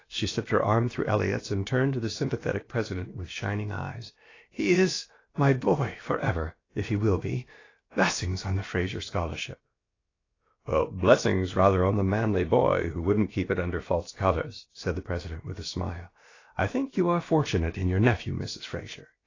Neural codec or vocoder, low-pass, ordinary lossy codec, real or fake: codec, 16 kHz, about 1 kbps, DyCAST, with the encoder's durations; 7.2 kHz; AAC, 32 kbps; fake